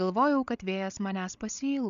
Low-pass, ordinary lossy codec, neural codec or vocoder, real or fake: 7.2 kHz; MP3, 96 kbps; codec, 16 kHz, 8 kbps, FreqCodec, larger model; fake